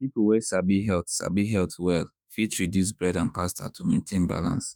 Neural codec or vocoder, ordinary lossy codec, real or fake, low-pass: autoencoder, 48 kHz, 32 numbers a frame, DAC-VAE, trained on Japanese speech; none; fake; none